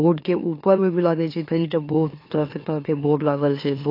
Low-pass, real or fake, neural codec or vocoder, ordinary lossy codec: 5.4 kHz; fake; autoencoder, 44.1 kHz, a latent of 192 numbers a frame, MeloTTS; AAC, 32 kbps